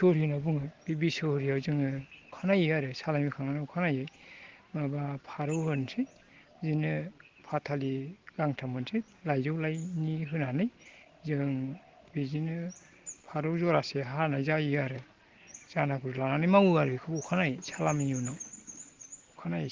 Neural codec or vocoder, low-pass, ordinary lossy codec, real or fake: none; 7.2 kHz; Opus, 16 kbps; real